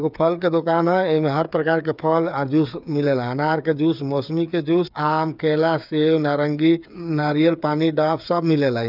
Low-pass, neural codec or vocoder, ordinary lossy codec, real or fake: 5.4 kHz; codec, 16 kHz, 8 kbps, FreqCodec, smaller model; none; fake